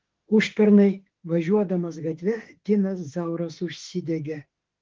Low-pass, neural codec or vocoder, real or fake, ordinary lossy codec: 7.2 kHz; autoencoder, 48 kHz, 32 numbers a frame, DAC-VAE, trained on Japanese speech; fake; Opus, 16 kbps